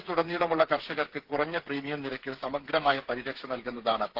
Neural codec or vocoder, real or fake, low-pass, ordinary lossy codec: codec, 16 kHz, 8 kbps, FreqCodec, smaller model; fake; 5.4 kHz; Opus, 16 kbps